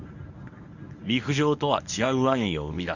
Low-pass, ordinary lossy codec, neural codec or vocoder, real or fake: 7.2 kHz; none; codec, 24 kHz, 0.9 kbps, WavTokenizer, medium speech release version 1; fake